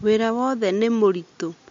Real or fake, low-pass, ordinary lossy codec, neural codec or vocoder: real; 7.2 kHz; MP3, 48 kbps; none